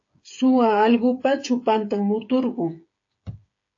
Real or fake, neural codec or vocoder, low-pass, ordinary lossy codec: fake; codec, 16 kHz, 8 kbps, FreqCodec, smaller model; 7.2 kHz; AAC, 48 kbps